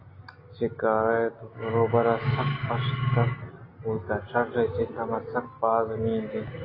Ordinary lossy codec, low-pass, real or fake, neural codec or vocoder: AAC, 24 kbps; 5.4 kHz; real; none